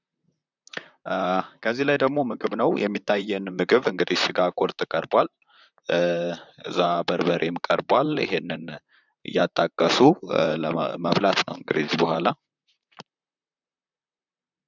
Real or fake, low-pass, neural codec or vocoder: fake; 7.2 kHz; vocoder, 44.1 kHz, 128 mel bands, Pupu-Vocoder